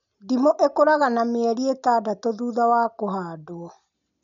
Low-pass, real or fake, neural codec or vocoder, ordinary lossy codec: 7.2 kHz; real; none; none